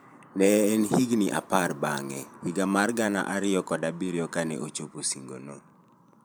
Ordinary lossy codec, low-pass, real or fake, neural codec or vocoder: none; none; fake; vocoder, 44.1 kHz, 128 mel bands every 512 samples, BigVGAN v2